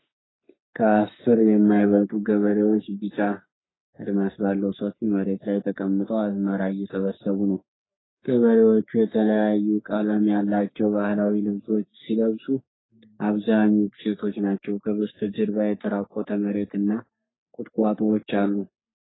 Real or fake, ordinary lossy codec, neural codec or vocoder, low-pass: fake; AAC, 16 kbps; codec, 44.1 kHz, 3.4 kbps, Pupu-Codec; 7.2 kHz